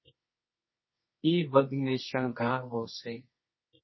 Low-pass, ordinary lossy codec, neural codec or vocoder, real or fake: 7.2 kHz; MP3, 24 kbps; codec, 24 kHz, 0.9 kbps, WavTokenizer, medium music audio release; fake